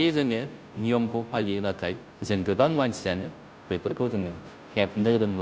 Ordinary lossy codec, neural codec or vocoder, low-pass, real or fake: none; codec, 16 kHz, 0.5 kbps, FunCodec, trained on Chinese and English, 25 frames a second; none; fake